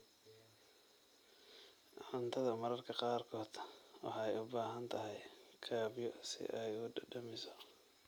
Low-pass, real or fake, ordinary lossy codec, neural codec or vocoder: none; real; none; none